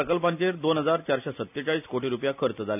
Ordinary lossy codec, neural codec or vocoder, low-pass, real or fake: none; none; 3.6 kHz; real